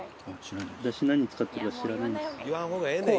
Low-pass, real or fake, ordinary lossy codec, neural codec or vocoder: none; real; none; none